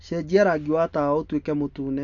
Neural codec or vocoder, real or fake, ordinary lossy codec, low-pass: none; real; none; 7.2 kHz